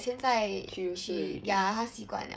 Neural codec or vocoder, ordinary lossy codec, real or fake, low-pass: codec, 16 kHz, 16 kbps, FreqCodec, smaller model; none; fake; none